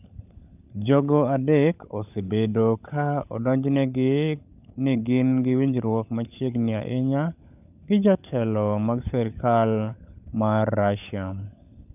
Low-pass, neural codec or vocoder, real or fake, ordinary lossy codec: 3.6 kHz; codec, 16 kHz, 16 kbps, FunCodec, trained on LibriTTS, 50 frames a second; fake; none